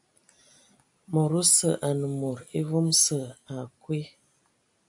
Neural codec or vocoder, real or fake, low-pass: none; real; 10.8 kHz